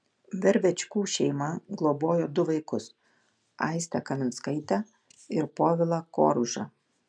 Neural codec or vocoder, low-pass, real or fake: none; 9.9 kHz; real